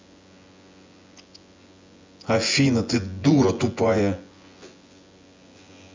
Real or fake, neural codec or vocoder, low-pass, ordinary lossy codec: fake; vocoder, 24 kHz, 100 mel bands, Vocos; 7.2 kHz; MP3, 64 kbps